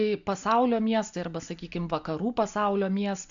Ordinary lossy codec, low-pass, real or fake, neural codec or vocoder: AAC, 64 kbps; 7.2 kHz; real; none